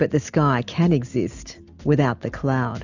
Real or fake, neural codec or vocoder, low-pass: real; none; 7.2 kHz